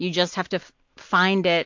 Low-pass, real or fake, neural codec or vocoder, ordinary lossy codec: 7.2 kHz; real; none; MP3, 48 kbps